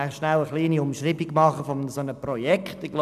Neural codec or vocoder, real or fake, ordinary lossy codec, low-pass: none; real; none; 14.4 kHz